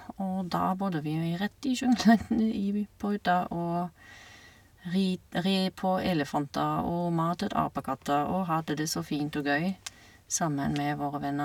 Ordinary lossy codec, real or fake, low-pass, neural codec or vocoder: none; real; 19.8 kHz; none